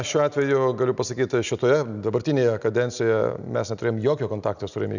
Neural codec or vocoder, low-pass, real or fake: none; 7.2 kHz; real